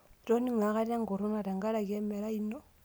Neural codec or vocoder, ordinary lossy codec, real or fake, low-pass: none; none; real; none